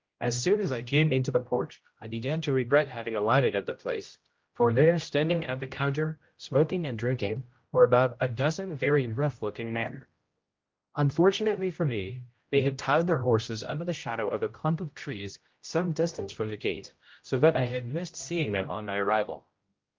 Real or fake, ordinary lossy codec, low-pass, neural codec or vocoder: fake; Opus, 32 kbps; 7.2 kHz; codec, 16 kHz, 0.5 kbps, X-Codec, HuBERT features, trained on general audio